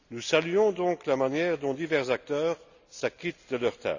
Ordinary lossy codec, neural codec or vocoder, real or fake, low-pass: none; none; real; 7.2 kHz